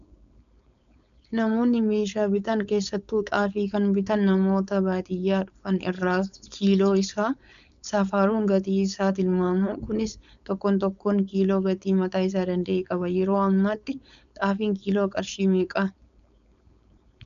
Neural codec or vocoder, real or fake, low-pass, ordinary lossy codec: codec, 16 kHz, 4.8 kbps, FACodec; fake; 7.2 kHz; MP3, 96 kbps